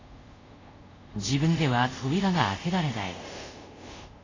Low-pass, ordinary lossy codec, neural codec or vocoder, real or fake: 7.2 kHz; AAC, 32 kbps; codec, 24 kHz, 0.5 kbps, DualCodec; fake